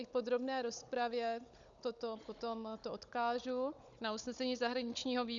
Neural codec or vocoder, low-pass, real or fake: codec, 16 kHz, 16 kbps, FunCodec, trained on Chinese and English, 50 frames a second; 7.2 kHz; fake